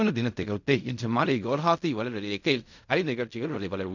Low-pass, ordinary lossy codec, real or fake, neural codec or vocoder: 7.2 kHz; none; fake; codec, 16 kHz in and 24 kHz out, 0.4 kbps, LongCat-Audio-Codec, fine tuned four codebook decoder